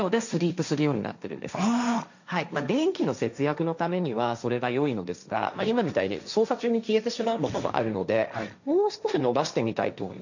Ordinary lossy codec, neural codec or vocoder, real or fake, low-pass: none; codec, 16 kHz, 1.1 kbps, Voila-Tokenizer; fake; 7.2 kHz